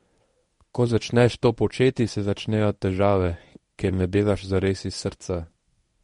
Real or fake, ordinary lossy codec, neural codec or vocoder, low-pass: fake; MP3, 48 kbps; codec, 24 kHz, 0.9 kbps, WavTokenizer, medium speech release version 1; 10.8 kHz